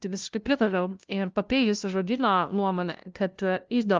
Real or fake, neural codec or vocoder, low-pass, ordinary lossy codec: fake; codec, 16 kHz, 0.5 kbps, FunCodec, trained on LibriTTS, 25 frames a second; 7.2 kHz; Opus, 24 kbps